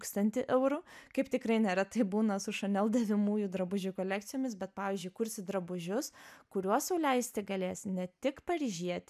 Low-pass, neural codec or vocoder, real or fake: 14.4 kHz; none; real